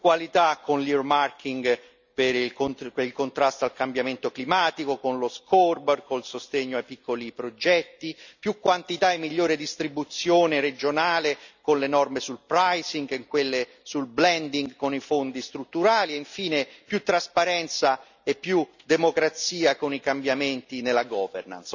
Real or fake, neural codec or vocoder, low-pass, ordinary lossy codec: real; none; 7.2 kHz; none